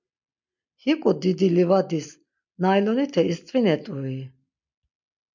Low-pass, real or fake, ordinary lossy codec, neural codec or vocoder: 7.2 kHz; real; MP3, 64 kbps; none